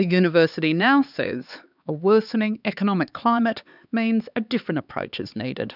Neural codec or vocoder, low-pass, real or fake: codec, 16 kHz, 4 kbps, X-Codec, HuBERT features, trained on LibriSpeech; 5.4 kHz; fake